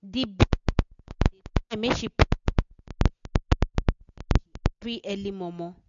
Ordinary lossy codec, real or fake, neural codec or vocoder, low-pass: none; real; none; 7.2 kHz